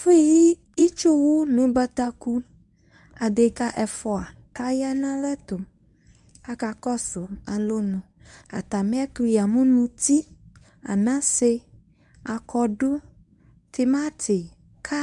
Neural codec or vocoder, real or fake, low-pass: codec, 24 kHz, 0.9 kbps, WavTokenizer, medium speech release version 1; fake; 10.8 kHz